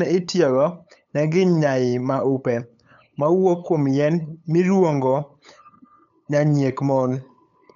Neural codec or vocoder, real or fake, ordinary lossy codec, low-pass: codec, 16 kHz, 8 kbps, FunCodec, trained on LibriTTS, 25 frames a second; fake; none; 7.2 kHz